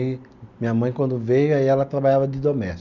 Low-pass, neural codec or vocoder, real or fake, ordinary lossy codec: 7.2 kHz; none; real; none